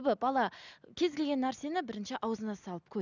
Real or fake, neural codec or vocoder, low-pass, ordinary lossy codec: real; none; 7.2 kHz; none